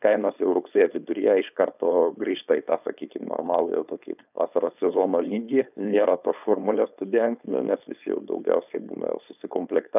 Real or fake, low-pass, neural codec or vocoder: fake; 3.6 kHz; codec, 16 kHz, 4.8 kbps, FACodec